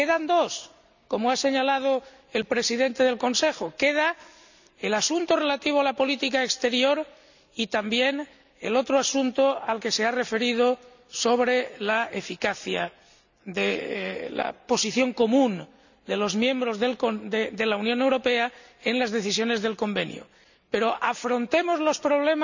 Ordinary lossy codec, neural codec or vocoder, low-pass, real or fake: none; none; 7.2 kHz; real